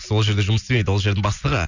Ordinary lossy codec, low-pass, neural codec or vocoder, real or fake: none; 7.2 kHz; none; real